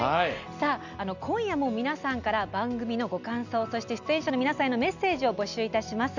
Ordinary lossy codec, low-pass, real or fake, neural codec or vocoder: none; 7.2 kHz; real; none